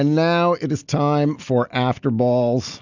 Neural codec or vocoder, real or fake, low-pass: none; real; 7.2 kHz